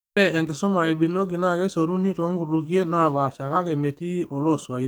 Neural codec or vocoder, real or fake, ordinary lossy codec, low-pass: codec, 44.1 kHz, 2.6 kbps, SNAC; fake; none; none